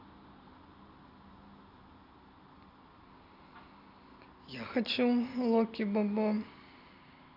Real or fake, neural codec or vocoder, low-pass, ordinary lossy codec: real; none; 5.4 kHz; MP3, 48 kbps